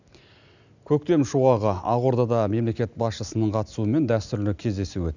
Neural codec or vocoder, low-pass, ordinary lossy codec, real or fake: none; 7.2 kHz; none; real